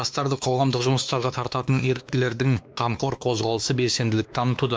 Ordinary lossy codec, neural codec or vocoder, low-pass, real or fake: none; codec, 16 kHz, 2 kbps, X-Codec, WavLM features, trained on Multilingual LibriSpeech; none; fake